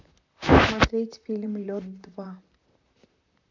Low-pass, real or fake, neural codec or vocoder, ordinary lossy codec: 7.2 kHz; fake; vocoder, 44.1 kHz, 128 mel bands, Pupu-Vocoder; none